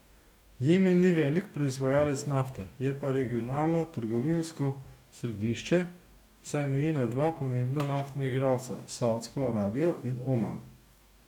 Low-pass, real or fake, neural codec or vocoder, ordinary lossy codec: 19.8 kHz; fake; codec, 44.1 kHz, 2.6 kbps, DAC; none